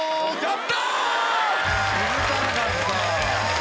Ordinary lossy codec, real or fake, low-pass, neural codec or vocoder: none; real; none; none